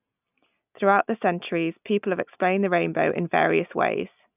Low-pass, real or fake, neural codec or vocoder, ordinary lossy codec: 3.6 kHz; real; none; none